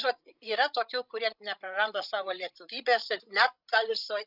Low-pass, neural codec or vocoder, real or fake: 5.4 kHz; codec, 16 kHz, 16 kbps, FreqCodec, larger model; fake